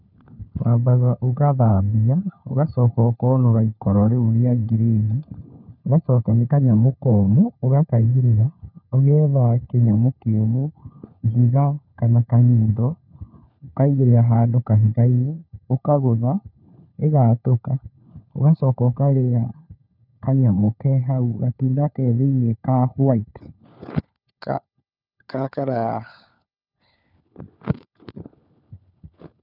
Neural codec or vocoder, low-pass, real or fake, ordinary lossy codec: codec, 16 kHz, 4 kbps, FunCodec, trained on LibriTTS, 50 frames a second; 5.4 kHz; fake; none